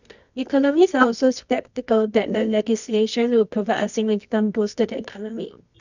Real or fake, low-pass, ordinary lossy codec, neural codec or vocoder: fake; 7.2 kHz; none; codec, 24 kHz, 0.9 kbps, WavTokenizer, medium music audio release